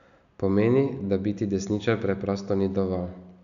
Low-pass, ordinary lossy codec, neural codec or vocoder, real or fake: 7.2 kHz; none; none; real